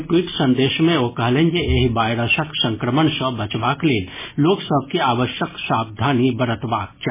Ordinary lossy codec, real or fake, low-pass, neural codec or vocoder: MP3, 16 kbps; real; 3.6 kHz; none